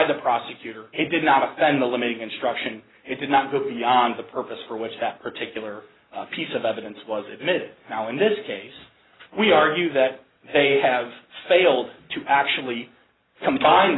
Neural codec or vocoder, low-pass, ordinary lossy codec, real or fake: none; 7.2 kHz; AAC, 16 kbps; real